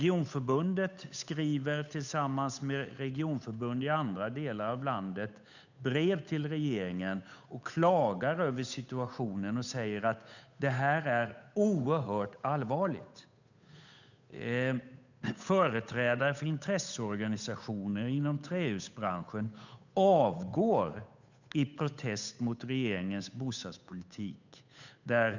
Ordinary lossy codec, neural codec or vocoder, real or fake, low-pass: none; codec, 16 kHz, 8 kbps, FunCodec, trained on Chinese and English, 25 frames a second; fake; 7.2 kHz